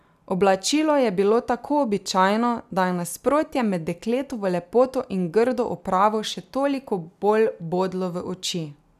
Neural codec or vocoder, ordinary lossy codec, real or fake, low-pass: none; none; real; 14.4 kHz